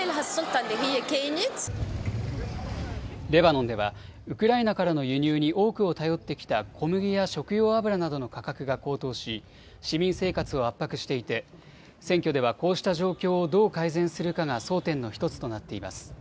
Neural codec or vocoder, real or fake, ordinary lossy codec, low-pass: none; real; none; none